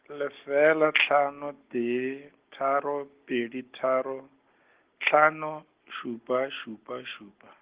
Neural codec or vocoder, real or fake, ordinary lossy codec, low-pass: none; real; Opus, 16 kbps; 3.6 kHz